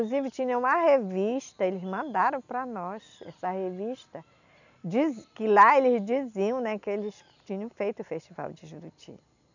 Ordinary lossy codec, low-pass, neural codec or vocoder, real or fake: none; 7.2 kHz; none; real